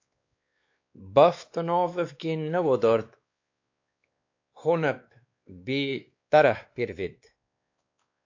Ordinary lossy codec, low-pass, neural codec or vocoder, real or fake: AAC, 48 kbps; 7.2 kHz; codec, 16 kHz, 2 kbps, X-Codec, WavLM features, trained on Multilingual LibriSpeech; fake